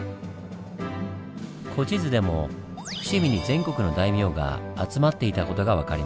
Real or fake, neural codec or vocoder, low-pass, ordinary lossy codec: real; none; none; none